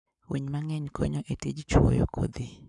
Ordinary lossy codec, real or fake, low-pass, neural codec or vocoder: none; fake; 10.8 kHz; codec, 44.1 kHz, 7.8 kbps, Pupu-Codec